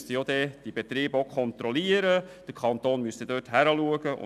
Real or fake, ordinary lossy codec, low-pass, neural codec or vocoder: real; none; 14.4 kHz; none